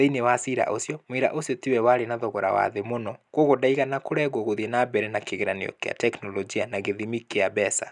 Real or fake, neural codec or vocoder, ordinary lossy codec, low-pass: real; none; none; 10.8 kHz